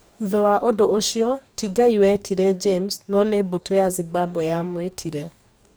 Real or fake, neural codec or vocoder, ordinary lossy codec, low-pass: fake; codec, 44.1 kHz, 2.6 kbps, DAC; none; none